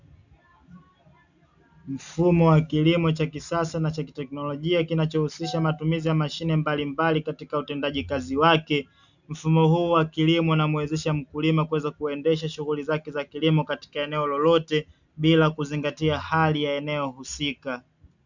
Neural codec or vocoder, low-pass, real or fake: none; 7.2 kHz; real